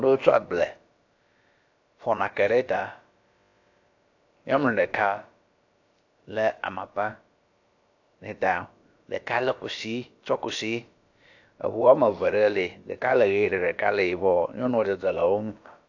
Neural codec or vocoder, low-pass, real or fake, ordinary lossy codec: codec, 16 kHz, about 1 kbps, DyCAST, with the encoder's durations; 7.2 kHz; fake; AAC, 48 kbps